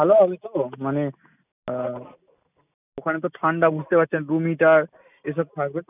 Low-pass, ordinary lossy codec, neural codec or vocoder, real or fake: 3.6 kHz; none; none; real